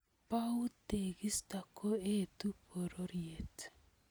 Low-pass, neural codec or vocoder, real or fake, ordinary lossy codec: none; none; real; none